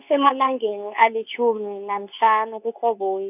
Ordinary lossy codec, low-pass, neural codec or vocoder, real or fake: none; 3.6 kHz; codec, 24 kHz, 0.9 kbps, WavTokenizer, medium speech release version 2; fake